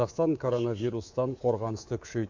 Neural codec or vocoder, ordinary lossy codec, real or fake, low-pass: codec, 24 kHz, 3.1 kbps, DualCodec; AAC, 48 kbps; fake; 7.2 kHz